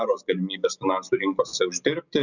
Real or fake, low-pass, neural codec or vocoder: real; 7.2 kHz; none